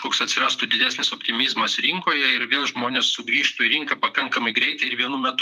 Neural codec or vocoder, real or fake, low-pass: vocoder, 44.1 kHz, 128 mel bands, Pupu-Vocoder; fake; 14.4 kHz